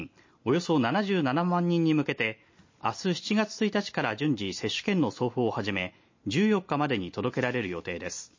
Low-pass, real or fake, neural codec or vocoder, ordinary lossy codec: 7.2 kHz; real; none; MP3, 32 kbps